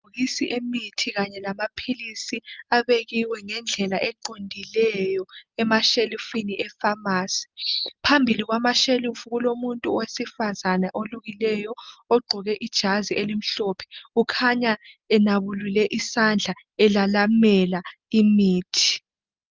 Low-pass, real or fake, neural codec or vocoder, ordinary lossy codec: 7.2 kHz; real; none; Opus, 24 kbps